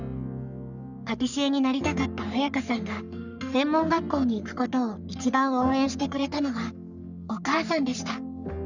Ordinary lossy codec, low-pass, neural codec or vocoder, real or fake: none; 7.2 kHz; codec, 44.1 kHz, 3.4 kbps, Pupu-Codec; fake